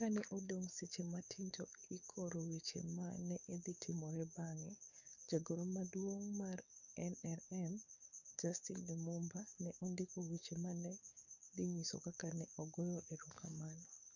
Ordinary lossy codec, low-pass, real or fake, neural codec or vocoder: none; 7.2 kHz; fake; codec, 44.1 kHz, 7.8 kbps, DAC